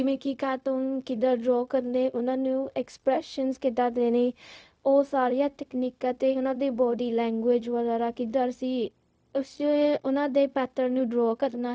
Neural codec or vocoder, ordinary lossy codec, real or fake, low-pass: codec, 16 kHz, 0.4 kbps, LongCat-Audio-Codec; none; fake; none